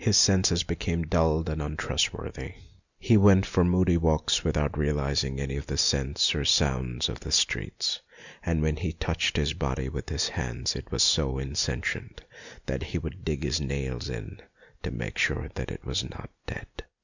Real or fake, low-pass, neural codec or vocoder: real; 7.2 kHz; none